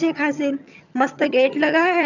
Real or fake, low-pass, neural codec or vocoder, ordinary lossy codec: fake; 7.2 kHz; vocoder, 22.05 kHz, 80 mel bands, HiFi-GAN; none